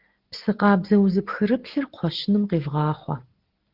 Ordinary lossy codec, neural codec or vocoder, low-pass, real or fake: Opus, 16 kbps; none; 5.4 kHz; real